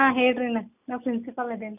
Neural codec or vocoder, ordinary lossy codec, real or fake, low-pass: none; none; real; 3.6 kHz